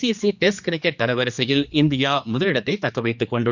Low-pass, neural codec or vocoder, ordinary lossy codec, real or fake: 7.2 kHz; codec, 16 kHz, 2 kbps, X-Codec, HuBERT features, trained on general audio; none; fake